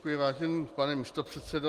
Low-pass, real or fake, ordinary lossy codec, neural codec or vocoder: 14.4 kHz; real; Opus, 24 kbps; none